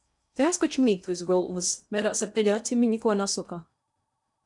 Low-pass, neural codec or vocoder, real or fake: 10.8 kHz; codec, 16 kHz in and 24 kHz out, 0.8 kbps, FocalCodec, streaming, 65536 codes; fake